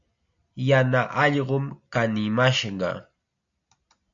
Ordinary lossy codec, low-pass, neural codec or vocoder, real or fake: AAC, 64 kbps; 7.2 kHz; none; real